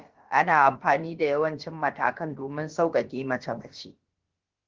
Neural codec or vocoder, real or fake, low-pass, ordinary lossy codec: codec, 16 kHz, about 1 kbps, DyCAST, with the encoder's durations; fake; 7.2 kHz; Opus, 16 kbps